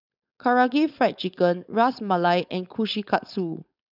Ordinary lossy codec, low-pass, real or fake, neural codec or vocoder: none; 5.4 kHz; fake; codec, 16 kHz, 4.8 kbps, FACodec